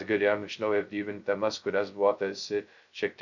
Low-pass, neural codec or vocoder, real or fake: 7.2 kHz; codec, 16 kHz, 0.2 kbps, FocalCodec; fake